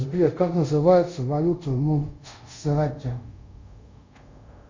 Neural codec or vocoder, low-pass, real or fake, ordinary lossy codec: codec, 24 kHz, 0.5 kbps, DualCodec; 7.2 kHz; fake; MP3, 64 kbps